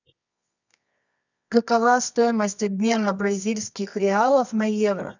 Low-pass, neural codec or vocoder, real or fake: 7.2 kHz; codec, 24 kHz, 0.9 kbps, WavTokenizer, medium music audio release; fake